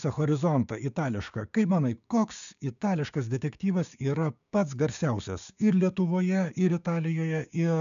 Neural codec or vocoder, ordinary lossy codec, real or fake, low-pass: codec, 16 kHz, 6 kbps, DAC; MP3, 96 kbps; fake; 7.2 kHz